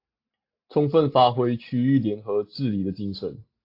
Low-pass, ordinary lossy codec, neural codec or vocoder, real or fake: 5.4 kHz; AAC, 32 kbps; none; real